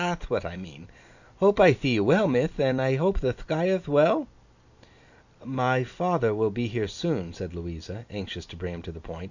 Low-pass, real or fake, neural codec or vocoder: 7.2 kHz; real; none